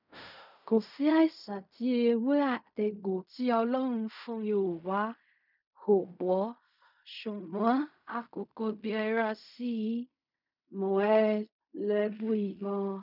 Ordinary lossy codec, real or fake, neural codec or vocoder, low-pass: none; fake; codec, 16 kHz in and 24 kHz out, 0.4 kbps, LongCat-Audio-Codec, fine tuned four codebook decoder; 5.4 kHz